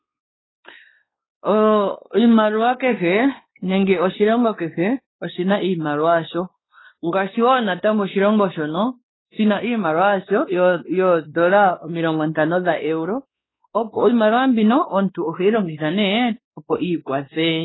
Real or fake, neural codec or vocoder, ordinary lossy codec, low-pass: fake; codec, 16 kHz, 2 kbps, X-Codec, WavLM features, trained on Multilingual LibriSpeech; AAC, 16 kbps; 7.2 kHz